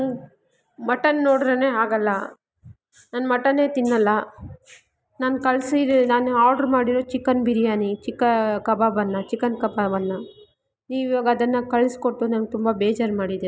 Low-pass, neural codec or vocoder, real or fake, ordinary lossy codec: none; none; real; none